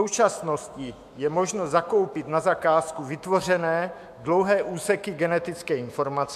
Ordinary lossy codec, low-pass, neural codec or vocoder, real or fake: MP3, 96 kbps; 14.4 kHz; autoencoder, 48 kHz, 128 numbers a frame, DAC-VAE, trained on Japanese speech; fake